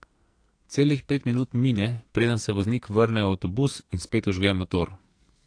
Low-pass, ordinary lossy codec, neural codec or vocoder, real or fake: 9.9 kHz; AAC, 48 kbps; codec, 44.1 kHz, 2.6 kbps, SNAC; fake